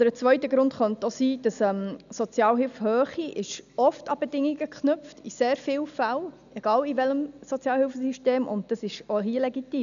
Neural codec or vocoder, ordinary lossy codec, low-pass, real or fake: none; none; 7.2 kHz; real